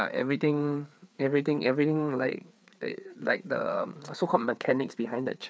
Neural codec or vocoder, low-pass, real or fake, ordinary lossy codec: codec, 16 kHz, 4 kbps, FreqCodec, larger model; none; fake; none